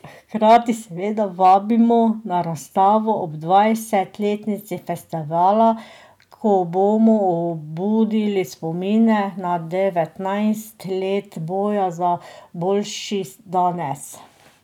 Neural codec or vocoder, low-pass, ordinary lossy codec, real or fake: none; 19.8 kHz; none; real